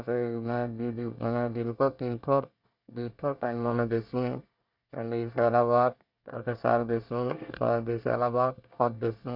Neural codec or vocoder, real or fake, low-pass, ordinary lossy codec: codec, 24 kHz, 1 kbps, SNAC; fake; 5.4 kHz; none